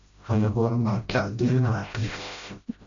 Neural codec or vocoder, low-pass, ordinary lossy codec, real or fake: codec, 16 kHz, 0.5 kbps, FreqCodec, smaller model; 7.2 kHz; AAC, 64 kbps; fake